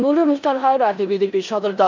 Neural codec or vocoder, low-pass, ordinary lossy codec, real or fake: codec, 16 kHz in and 24 kHz out, 0.9 kbps, LongCat-Audio-Codec, four codebook decoder; 7.2 kHz; MP3, 64 kbps; fake